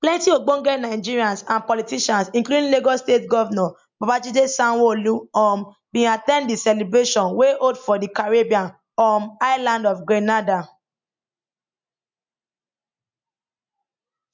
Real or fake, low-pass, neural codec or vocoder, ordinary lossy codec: real; 7.2 kHz; none; MP3, 64 kbps